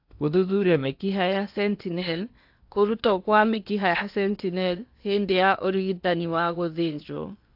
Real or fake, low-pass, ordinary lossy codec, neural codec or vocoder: fake; 5.4 kHz; AAC, 48 kbps; codec, 16 kHz in and 24 kHz out, 0.8 kbps, FocalCodec, streaming, 65536 codes